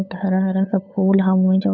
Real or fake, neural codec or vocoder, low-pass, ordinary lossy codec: fake; codec, 16 kHz, 8 kbps, FunCodec, trained on LibriTTS, 25 frames a second; none; none